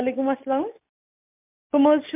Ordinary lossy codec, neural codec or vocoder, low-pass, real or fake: MP3, 32 kbps; none; 3.6 kHz; real